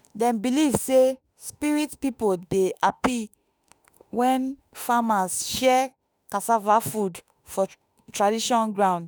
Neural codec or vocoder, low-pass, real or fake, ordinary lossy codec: autoencoder, 48 kHz, 32 numbers a frame, DAC-VAE, trained on Japanese speech; none; fake; none